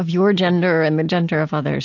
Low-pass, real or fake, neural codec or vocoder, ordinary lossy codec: 7.2 kHz; real; none; AAC, 48 kbps